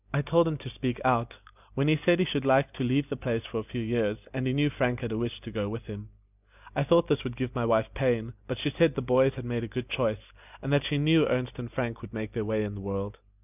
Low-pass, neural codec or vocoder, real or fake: 3.6 kHz; none; real